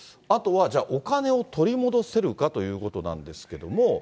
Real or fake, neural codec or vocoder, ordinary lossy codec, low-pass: real; none; none; none